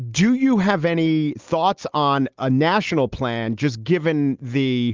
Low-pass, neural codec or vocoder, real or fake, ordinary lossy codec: 7.2 kHz; none; real; Opus, 32 kbps